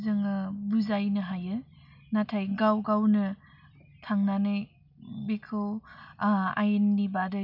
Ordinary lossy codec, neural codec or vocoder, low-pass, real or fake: none; none; 5.4 kHz; real